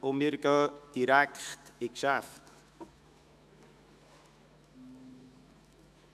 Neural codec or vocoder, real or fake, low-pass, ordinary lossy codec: autoencoder, 48 kHz, 128 numbers a frame, DAC-VAE, trained on Japanese speech; fake; 14.4 kHz; none